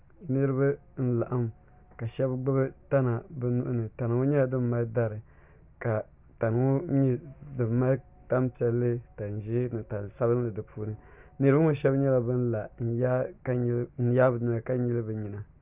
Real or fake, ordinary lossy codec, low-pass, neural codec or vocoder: real; Opus, 64 kbps; 3.6 kHz; none